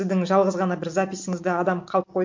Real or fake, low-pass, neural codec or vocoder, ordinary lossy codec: real; 7.2 kHz; none; MP3, 48 kbps